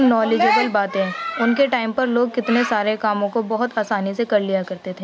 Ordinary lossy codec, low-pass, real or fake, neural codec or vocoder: none; none; real; none